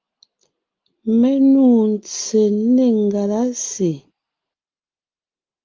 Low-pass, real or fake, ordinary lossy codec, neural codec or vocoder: 7.2 kHz; real; Opus, 24 kbps; none